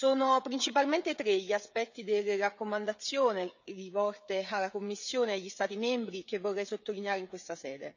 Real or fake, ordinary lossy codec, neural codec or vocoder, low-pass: fake; none; codec, 16 kHz, 16 kbps, FreqCodec, smaller model; 7.2 kHz